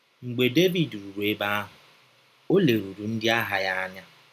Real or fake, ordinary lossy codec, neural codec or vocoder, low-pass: real; none; none; 14.4 kHz